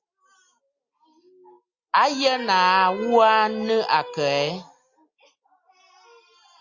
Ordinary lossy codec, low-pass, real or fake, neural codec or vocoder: Opus, 64 kbps; 7.2 kHz; real; none